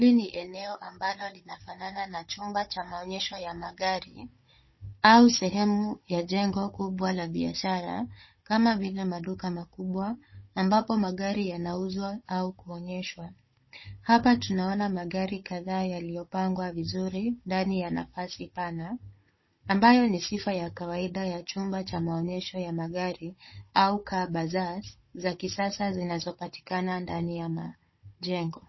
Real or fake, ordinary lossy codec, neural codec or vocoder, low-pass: fake; MP3, 24 kbps; codec, 16 kHz, 16 kbps, FunCodec, trained on Chinese and English, 50 frames a second; 7.2 kHz